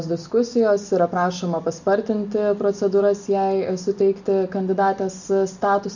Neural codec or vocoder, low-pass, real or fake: none; 7.2 kHz; real